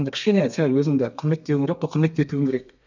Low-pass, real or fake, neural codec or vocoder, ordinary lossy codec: 7.2 kHz; fake; codec, 32 kHz, 1.9 kbps, SNAC; none